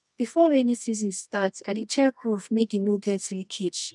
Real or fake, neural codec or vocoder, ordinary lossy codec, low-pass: fake; codec, 24 kHz, 0.9 kbps, WavTokenizer, medium music audio release; none; 10.8 kHz